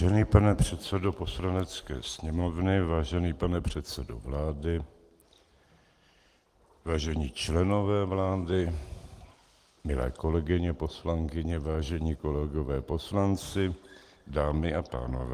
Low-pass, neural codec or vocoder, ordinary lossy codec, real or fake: 14.4 kHz; none; Opus, 24 kbps; real